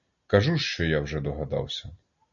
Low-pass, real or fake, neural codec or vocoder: 7.2 kHz; real; none